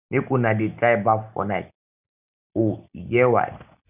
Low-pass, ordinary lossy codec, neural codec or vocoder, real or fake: 3.6 kHz; none; none; real